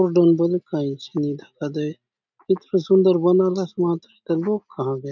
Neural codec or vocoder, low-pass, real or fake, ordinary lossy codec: none; 7.2 kHz; real; none